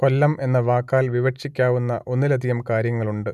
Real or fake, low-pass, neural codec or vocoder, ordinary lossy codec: real; 14.4 kHz; none; none